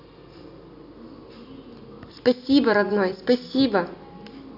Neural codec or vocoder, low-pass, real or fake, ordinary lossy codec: none; 5.4 kHz; real; none